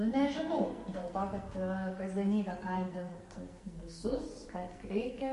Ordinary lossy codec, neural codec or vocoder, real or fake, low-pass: MP3, 48 kbps; codec, 44.1 kHz, 2.6 kbps, SNAC; fake; 14.4 kHz